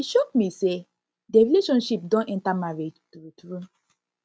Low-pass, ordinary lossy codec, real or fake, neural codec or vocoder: none; none; real; none